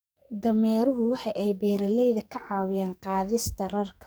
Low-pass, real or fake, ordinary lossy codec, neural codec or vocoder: none; fake; none; codec, 44.1 kHz, 2.6 kbps, SNAC